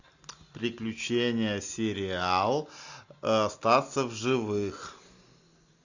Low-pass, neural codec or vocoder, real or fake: 7.2 kHz; none; real